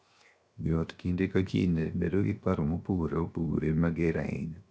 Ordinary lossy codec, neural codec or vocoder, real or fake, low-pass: none; codec, 16 kHz, 0.7 kbps, FocalCodec; fake; none